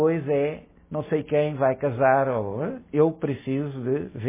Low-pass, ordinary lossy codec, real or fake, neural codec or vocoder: 3.6 kHz; MP3, 16 kbps; real; none